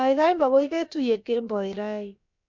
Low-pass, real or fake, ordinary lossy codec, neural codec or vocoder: 7.2 kHz; fake; MP3, 64 kbps; codec, 16 kHz, about 1 kbps, DyCAST, with the encoder's durations